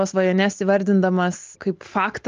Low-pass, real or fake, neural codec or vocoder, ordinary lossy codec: 7.2 kHz; real; none; Opus, 32 kbps